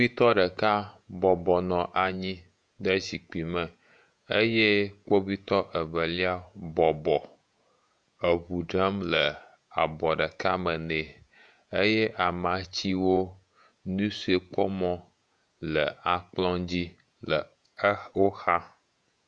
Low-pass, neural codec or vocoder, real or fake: 9.9 kHz; vocoder, 48 kHz, 128 mel bands, Vocos; fake